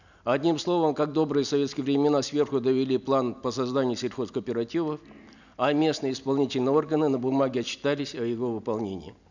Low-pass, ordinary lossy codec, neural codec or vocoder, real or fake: 7.2 kHz; none; none; real